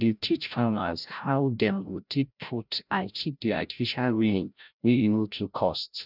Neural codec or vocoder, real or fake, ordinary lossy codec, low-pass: codec, 16 kHz, 0.5 kbps, FreqCodec, larger model; fake; none; 5.4 kHz